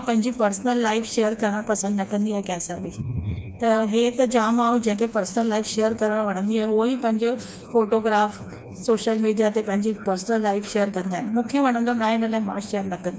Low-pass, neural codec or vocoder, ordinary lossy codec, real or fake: none; codec, 16 kHz, 2 kbps, FreqCodec, smaller model; none; fake